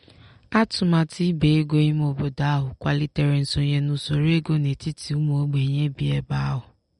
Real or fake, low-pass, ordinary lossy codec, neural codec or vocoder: real; 10.8 kHz; MP3, 48 kbps; none